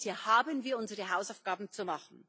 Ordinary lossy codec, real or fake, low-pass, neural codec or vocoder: none; real; none; none